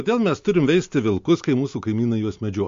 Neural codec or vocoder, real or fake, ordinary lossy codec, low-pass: none; real; MP3, 48 kbps; 7.2 kHz